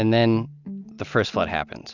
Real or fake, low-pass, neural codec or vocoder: real; 7.2 kHz; none